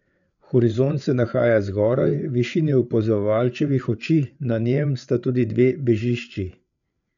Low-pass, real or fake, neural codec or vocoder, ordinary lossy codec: 7.2 kHz; fake; codec, 16 kHz, 8 kbps, FreqCodec, larger model; none